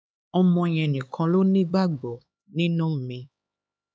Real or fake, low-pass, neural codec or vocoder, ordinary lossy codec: fake; none; codec, 16 kHz, 4 kbps, X-Codec, HuBERT features, trained on LibriSpeech; none